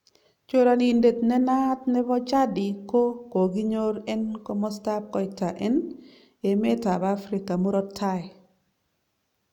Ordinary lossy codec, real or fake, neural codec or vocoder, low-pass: none; real; none; 19.8 kHz